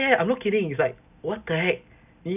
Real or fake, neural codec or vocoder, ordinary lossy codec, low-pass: real; none; none; 3.6 kHz